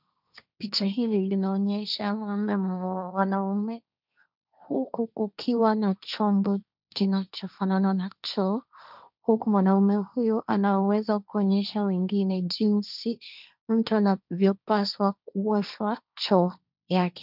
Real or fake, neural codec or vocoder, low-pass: fake; codec, 16 kHz, 1.1 kbps, Voila-Tokenizer; 5.4 kHz